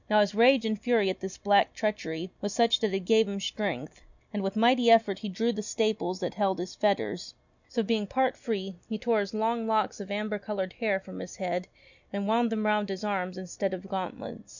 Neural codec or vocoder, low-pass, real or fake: none; 7.2 kHz; real